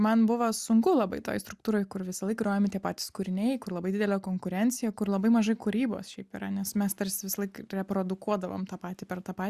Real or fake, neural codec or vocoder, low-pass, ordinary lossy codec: real; none; 14.4 kHz; Opus, 64 kbps